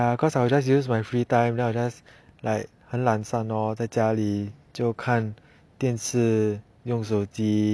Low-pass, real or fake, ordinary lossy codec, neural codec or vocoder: none; real; none; none